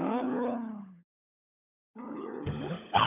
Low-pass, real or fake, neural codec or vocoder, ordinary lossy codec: 3.6 kHz; fake; codec, 16 kHz, 16 kbps, FunCodec, trained on LibriTTS, 50 frames a second; none